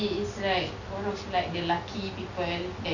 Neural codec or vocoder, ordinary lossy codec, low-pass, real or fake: none; none; 7.2 kHz; real